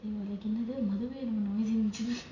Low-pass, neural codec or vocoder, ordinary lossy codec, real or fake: 7.2 kHz; none; none; real